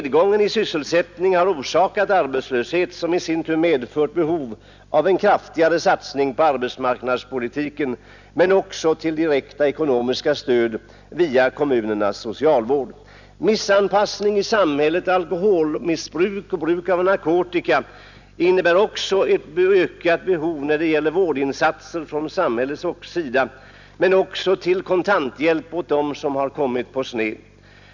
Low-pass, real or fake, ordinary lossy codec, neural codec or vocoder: 7.2 kHz; real; none; none